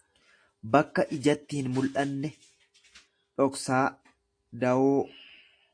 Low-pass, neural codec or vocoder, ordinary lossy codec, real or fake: 9.9 kHz; none; AAC, 48 kbps; real